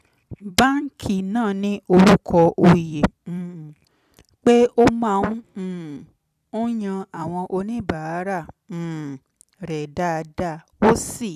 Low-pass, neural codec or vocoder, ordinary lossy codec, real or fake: 14.4 kHz; vocoder, 44.1 kHz, 128 mel bands every 256 samples, BigVGAN v2; none; fake